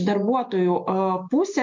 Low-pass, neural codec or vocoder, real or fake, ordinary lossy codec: 7.2 kHz; none; real; MP3, 48 kbps